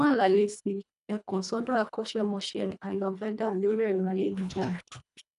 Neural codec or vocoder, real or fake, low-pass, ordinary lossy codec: codec, 24 kHz, 1.5 kbps, HILCodec; fake; 10.8 kHz; none